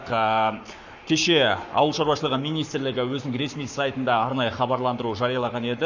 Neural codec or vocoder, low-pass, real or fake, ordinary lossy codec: codec, 44.1 kHz, 7.8 kbps, Pupu-Codec; 7.2 kHz; fake; none